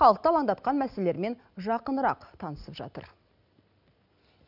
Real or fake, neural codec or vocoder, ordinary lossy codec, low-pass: real; none; none; 5.4 kHz